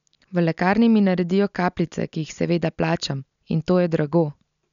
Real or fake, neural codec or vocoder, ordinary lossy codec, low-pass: real; none; none; 7.2 kHz